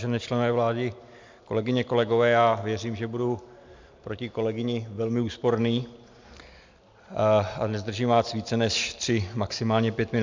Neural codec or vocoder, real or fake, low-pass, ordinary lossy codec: none; real; 7.2 kHz; MP3, 64 kbps